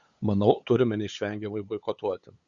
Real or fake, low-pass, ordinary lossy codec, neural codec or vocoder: fake; 7.2 kHz; Opus, 64 kbps; codec, 16 kHz, 8 kbps, FunCodec, trained on LibriTTS, 25 frames a second